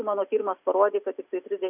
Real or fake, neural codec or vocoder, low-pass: real; none; 3.6 kHz